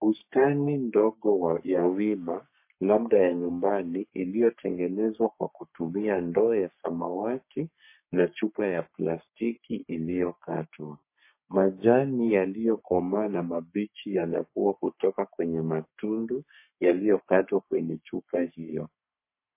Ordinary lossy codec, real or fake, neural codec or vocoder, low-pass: MP3, 24 kbps; fake; codec, 44.1 kHz, 3.4 kbps, Pupu-Codec; 3.6 kHz